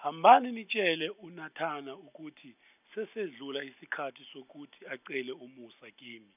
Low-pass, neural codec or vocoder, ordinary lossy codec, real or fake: 3.6 kHz; none; none; real